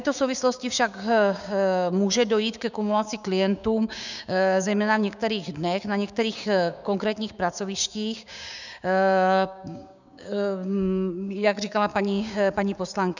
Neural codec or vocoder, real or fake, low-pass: codec, 16 kHz, 6 kbps, DAC; fake; 7.2 kHz